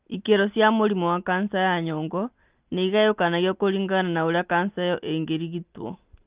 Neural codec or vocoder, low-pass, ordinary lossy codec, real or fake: none; 3.6 kHz; Opus, 32 kbps; real